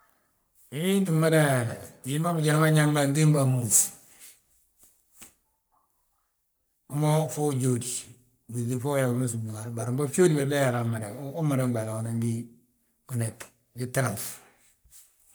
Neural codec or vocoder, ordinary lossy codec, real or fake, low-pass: codec, 44.1 kHz, 3.4 kbps, Pupu-Codec; none; fake; none